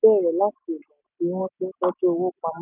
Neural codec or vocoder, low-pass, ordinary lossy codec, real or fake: none; 3.6 kHz; none; real